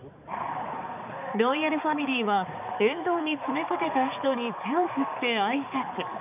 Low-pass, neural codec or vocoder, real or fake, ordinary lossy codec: 3.6 kHz; codec, 16 kHz, 4 kbps, X-Codec, HuBERT features, trained on balanced general audio; fake; none